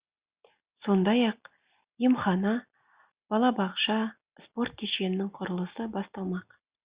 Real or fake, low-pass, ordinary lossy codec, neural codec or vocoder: real; 3.6 kHz; Opus, 64 kbps; none